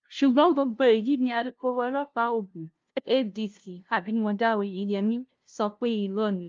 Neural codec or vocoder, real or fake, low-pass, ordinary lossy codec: codec, 16 kHz, 0.5 kbps, FunCodec, trained on LibriTTS, 25 frames a second; fake; 7.2 kHz; Opus, 32 kbps